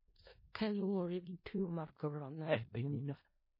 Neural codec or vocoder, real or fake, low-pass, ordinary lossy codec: codec, 16 kHz in and 24 kHz out, 0.4 kbps, LongCat-Audio-Codec, four codebook decoder; fake; 5.4 kHz; MP3, 24 kbps